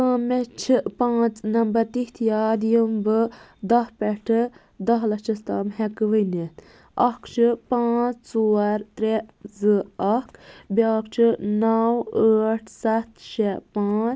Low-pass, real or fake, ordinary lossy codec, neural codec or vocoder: none; real; none; none